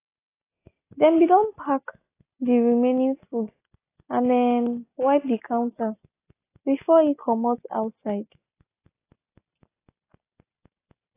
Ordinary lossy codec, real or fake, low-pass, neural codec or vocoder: AAC, 24 kbps; real; 3.6 kHz; none